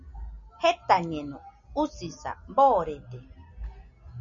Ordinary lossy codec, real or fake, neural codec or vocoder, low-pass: MP3, 96 kbps; real; none; 7.2 kHz